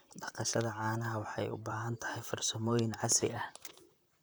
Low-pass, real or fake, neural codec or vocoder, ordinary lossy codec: none; fake; vocoder, 44.1 kHz, 128 mel bands, Pupu-Vocoder; none